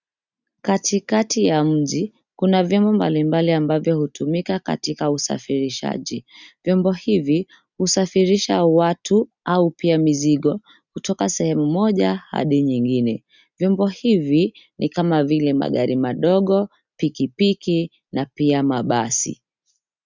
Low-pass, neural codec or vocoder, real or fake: 7.2 kHz; none; real